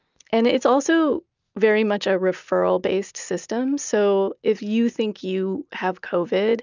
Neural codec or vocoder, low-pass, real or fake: none; 7.2 kHz; real